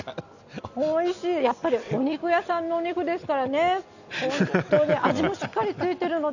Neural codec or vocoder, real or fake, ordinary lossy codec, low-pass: none; real; none; 7.2 kHz